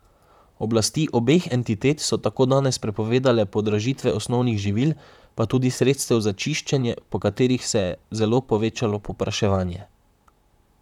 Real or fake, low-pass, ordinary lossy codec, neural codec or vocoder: fake; 19.8 kHz; none; vocoder, 44.1 kHz, 128 mel bands, Pupu-Vocoder